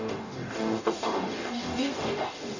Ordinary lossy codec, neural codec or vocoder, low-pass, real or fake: none; codec, 44.1 kHz, 0.9 kbps, DAC; 7.2 kHz; fake